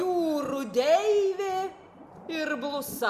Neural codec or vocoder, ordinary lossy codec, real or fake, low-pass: none; Opus, 64 kbps; real; 14.4 kHz